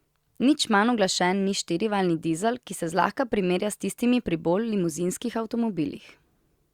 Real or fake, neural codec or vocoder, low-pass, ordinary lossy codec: real; none; 19.8 kHz; Opus, 64 kbps